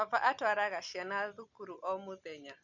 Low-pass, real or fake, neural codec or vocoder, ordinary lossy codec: 7.2 kHz; real; none; none